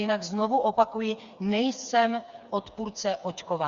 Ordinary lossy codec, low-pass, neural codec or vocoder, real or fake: Opus, 64 kbps; 7.2 kHz; codec, 16 kHz, 4 kbps, FreqCodec, smaller model; fake